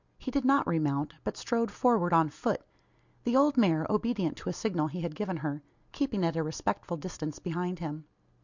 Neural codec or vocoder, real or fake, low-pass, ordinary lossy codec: none; real; 7.2 kHz; Opus, 64 kbps